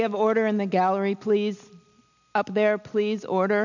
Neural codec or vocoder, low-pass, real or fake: none; 7.2 kHz; real